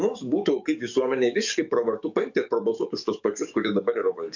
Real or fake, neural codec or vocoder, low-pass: fake; codec, 44.1 kHz, 7.8 kbps, DAC; 7.2 kHz